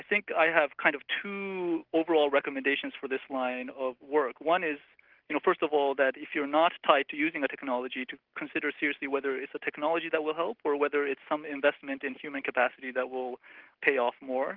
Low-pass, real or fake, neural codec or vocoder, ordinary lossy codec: 5.4 kHz; real; none; Opus, 16 kbps